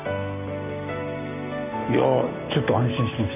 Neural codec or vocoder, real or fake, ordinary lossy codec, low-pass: none; real; none; 3.6 kHz